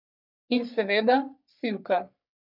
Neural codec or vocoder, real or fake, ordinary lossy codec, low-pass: codec, 44.1 kHz, 3.4 kbps, Pupu-Codec; fake; none; 5.4 kHz